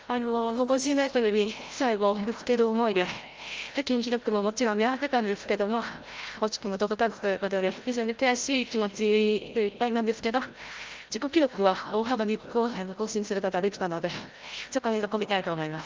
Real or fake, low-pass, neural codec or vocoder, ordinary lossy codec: fake; 7.2 kHz; codec, 16 kHz, 0.5 kbps, FreqCodec, larger model; Opus, 24 kbps